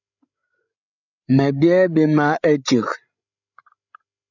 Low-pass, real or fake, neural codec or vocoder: 7.2 kHz; fake; codec, 16 kHz, 16 kbps, FreqCodec, larger model